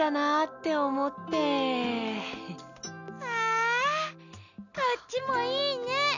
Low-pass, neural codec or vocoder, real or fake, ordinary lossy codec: 7.2 kHz; none; real; none